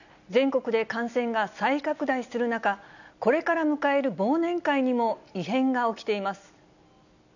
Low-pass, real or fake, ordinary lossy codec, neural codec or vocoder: 7.2 kHz; real; none; none